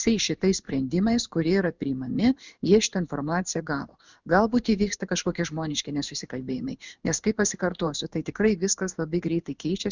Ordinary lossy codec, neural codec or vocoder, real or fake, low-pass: Opus, 64 kbps; codec, 16 kHz in and 24 kHz out, 1 kbps, XY-Tokenizer; fake; 7.2 kHz